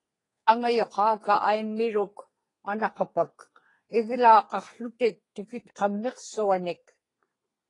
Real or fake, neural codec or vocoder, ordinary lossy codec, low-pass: fake; codec, 32 kHz, 1.9 kbps, SNAC; AAC, 32 kbps; 10.8 kHz